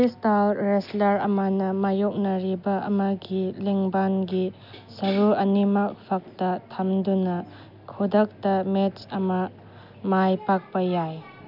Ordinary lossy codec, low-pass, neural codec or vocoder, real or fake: none; 5.4 kHz; none; real